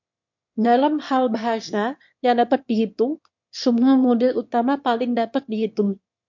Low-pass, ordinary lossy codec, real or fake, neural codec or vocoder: 7.2 kHz; MP3, 48 kbps; fake; autoencoder, 22.05 kHz, a latent of 192 numbers a frame, VITS, trained on one speaker